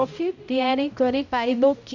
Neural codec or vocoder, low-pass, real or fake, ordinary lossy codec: codec, 16 kHz, 0.5 kbps, X-Codec, HuBERT features, trained on balanced general audio; 7.2 kHz; fake; none